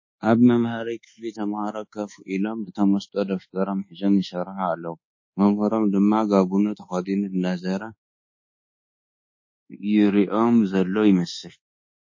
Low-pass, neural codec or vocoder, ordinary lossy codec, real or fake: 7.2 kHz; codec, 24 kHz, 1.2 kbps, DualCodec; MP3, 32 kbps; fake